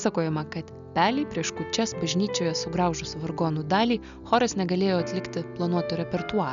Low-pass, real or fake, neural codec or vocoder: 7.2 kHz; real; none